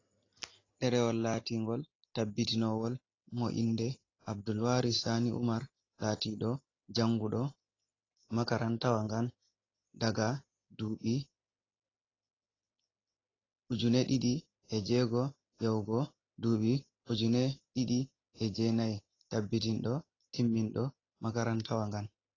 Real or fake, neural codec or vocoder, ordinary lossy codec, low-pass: real; none; AAC, 32 kbps; 7.2 kHz